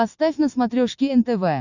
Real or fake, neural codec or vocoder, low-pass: real; none; 7.2 kHz